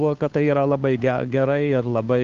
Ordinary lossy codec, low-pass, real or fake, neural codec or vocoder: Opus, 32 kbps; 7.2 kHz; fake; codec, 16 kHz, 2 kbps, FunCodec, trained on Chinese and English, 25 frames a second